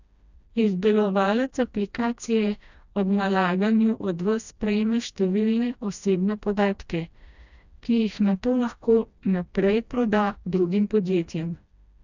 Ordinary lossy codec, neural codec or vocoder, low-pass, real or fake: none; codec, 16 kHz, 1 kbps, FreqCodec, smaller model; 7.2 kHz; fake